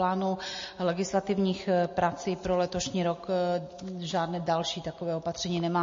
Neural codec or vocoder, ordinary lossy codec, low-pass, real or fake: none; MP3, 32 kbps; 7.2 kHz; real